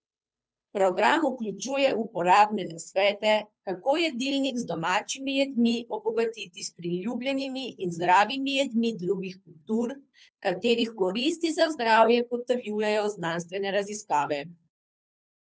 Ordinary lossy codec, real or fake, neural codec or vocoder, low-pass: none; fake; codec, 16 kHz, 2 kbps, FunCodec, trained on Chinese and English, 25 frames a second; none